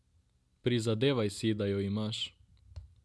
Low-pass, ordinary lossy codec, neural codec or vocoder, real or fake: none; none; none; real